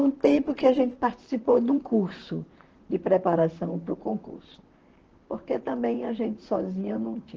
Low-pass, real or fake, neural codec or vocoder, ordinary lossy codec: 7.2 kHz; fake; vocoder, 44.1 kHz, 128 mel bands, Pupu-Vocoder; Opus, 16 kbps